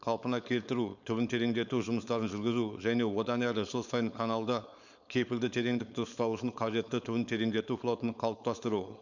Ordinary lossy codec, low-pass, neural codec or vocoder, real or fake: none; 7.2 kHz; codec, 16 kHz, 4.8 kbps, FACodec; fake